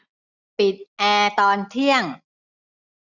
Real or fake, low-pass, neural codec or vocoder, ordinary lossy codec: real; 7.2 kHz; none; none